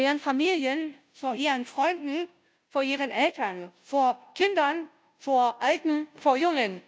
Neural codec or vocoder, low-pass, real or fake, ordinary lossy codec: codec, 16 kHz, 0.5 kbps, FunCodec, trained on Chinese and English, 25 frames a second; none; fake; none